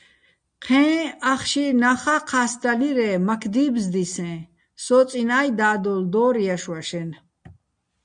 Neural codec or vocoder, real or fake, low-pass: none; real; 9.9 kHz